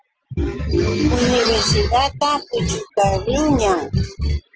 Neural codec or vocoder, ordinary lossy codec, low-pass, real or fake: none; Opus, 16 kbps; 7.2 kHz; real